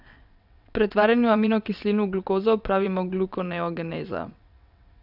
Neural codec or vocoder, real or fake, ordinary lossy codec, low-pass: vocoder, 22.05 kHz, 80 mel bands, WaveNeXt; fake; none; 5.4 kHz